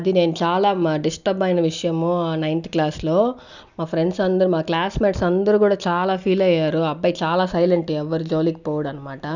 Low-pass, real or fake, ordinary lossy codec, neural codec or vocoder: 7.2 kHz; real; none; none